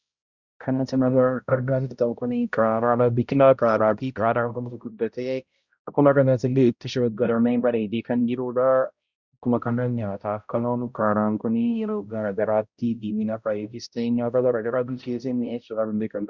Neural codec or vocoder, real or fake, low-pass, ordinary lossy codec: codec, 16 kHz, 0.5 kbps, X-Codec, HuBERT features, trained on balanced general audio; fake; 7.2 kHz; Opus, 64 kbps